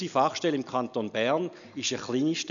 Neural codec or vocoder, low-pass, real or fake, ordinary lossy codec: none; 7.2 kHz; real; none